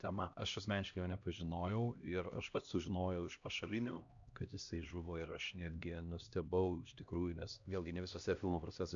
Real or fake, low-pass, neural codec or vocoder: fake; 7.2 kHz; codec, 16 kHz, 1 kbps, X-Codec, HuBERT features, trained on LibriSpeech